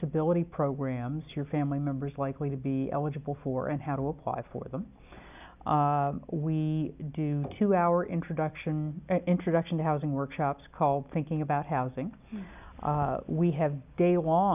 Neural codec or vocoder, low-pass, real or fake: none; 3.6 kHz; real